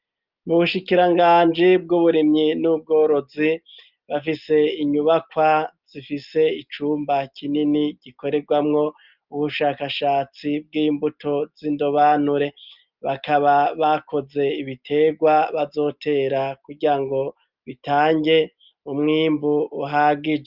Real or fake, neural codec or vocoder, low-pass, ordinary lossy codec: real; none; 5.4 kHz; Opus, 24 kbps